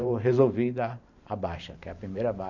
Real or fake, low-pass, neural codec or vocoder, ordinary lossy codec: fake; 7.2 kHz; vocoder, 44.1 kHz, 128 mel bands every 512 samples, BigVGAN v2; none